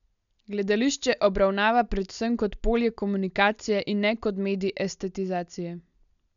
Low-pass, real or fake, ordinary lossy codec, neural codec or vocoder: 7.2 kHz; real; none; none